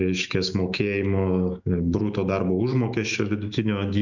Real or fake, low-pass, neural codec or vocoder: fake; 7.2 kHz; autoencoder, 48 kHz, 128 numbers a frame, DAC-VAE, trained on Japanese speech